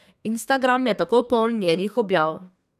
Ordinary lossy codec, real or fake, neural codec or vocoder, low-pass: none; fake; codec, 32 kHz, 1.9 kbps, SNAC; 14.4 kHz